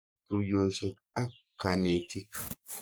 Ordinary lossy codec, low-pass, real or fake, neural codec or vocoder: none; none; fake; codec, 44.1 kHz, 3.4 kbps, Pupu-Codec